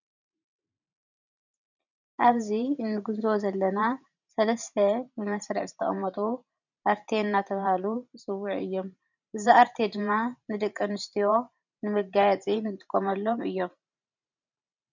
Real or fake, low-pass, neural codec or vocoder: fake; 7.2 kHz; vocoder, 44.1 kHz, 128 mel bands every 512 samples, BigVGAN v2